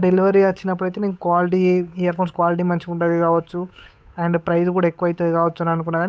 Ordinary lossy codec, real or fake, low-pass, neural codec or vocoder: none; fake; none; codec, 16 kHz, 8 kbps, FunCodec, trained on Chinese and English, 25 frames a second